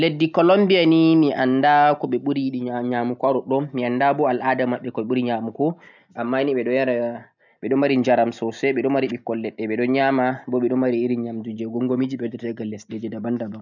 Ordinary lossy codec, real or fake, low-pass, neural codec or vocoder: none; real; 7.2 kHz; none